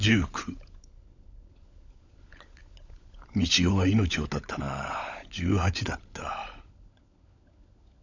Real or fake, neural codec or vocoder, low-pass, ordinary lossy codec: fake; codec, 16 kHz, 16 kbps, FunCodec, trained on LibriTTS, 50 frames a second; 7.2 kHz; none